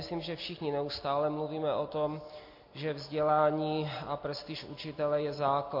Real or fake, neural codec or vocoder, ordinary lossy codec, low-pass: real; none; MP3, 32 kbps; 5.4 kHz